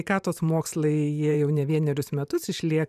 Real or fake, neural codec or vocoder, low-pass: fake; vocoder, 44.1 kHz, 128 mel bands every 512 samples, BigVGAN v2; 14.4 kHz